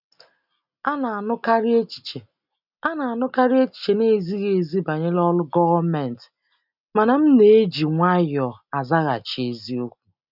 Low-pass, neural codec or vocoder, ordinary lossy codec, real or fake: 5.4 kHz; none; none; real